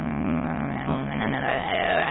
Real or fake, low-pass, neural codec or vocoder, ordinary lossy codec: fake; 7.2 kHz; autoencoder, 22.05 kHz, a latent of 192 numbers a frame, VITS, trained on many speakers; AAC, 16 kbps